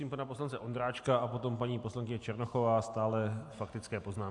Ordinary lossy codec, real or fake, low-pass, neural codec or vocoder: AAC, 64 kbps; real; 10.8 kHz; none